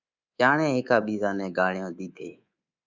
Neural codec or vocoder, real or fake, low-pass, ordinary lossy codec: codec, 24 kHz, 3.1 kbps, DualCodec; fake; 7.2 kHz; Opus, 64 kbps